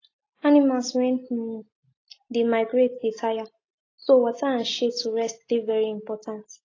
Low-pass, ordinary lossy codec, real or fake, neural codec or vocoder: 7.2 kHz; AAC, 32 kbps; real; none